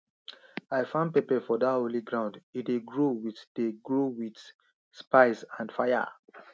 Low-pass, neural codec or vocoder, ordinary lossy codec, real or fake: none; none; none; real